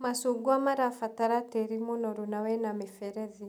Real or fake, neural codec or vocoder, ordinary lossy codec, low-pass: real; none; none; none